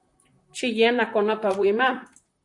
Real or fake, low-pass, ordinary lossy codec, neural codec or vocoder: fake; 10.8 kHz; MP3, 64 kbps; vocoder, 44.1 kHz, 128 mel bands, Pupu-Vocoder